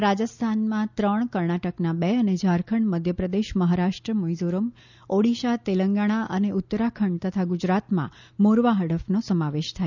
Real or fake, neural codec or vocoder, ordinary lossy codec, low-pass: real; none; none; 7.2 kHz